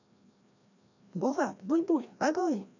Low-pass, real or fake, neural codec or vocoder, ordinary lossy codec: 7.2 kHz; fake; codec, 16 kHz, 1 kbps, FreqCodec, larger model; none